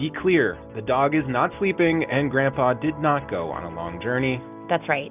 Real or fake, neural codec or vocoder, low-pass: real; none; 3.6 kHz